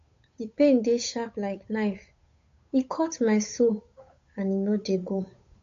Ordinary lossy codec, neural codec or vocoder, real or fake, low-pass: AAC, 48 kbps; codec, 16 kHz, 8 kbps, FunCodec, trained on Chinese and English, 25 frames a second; fake; 7.2 kHz